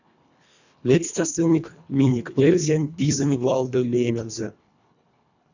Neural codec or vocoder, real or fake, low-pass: codec, 24 kHz, 1.5 kbps, HILCodec; fake; 7.2 kHz